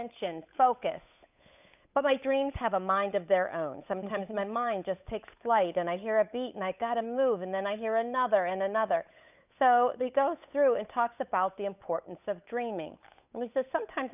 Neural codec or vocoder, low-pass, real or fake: codec, 16 kHz, 8 kbps, FunCodec, trained on Chinese and English, 25 frames a second; 3.6 kHz; fake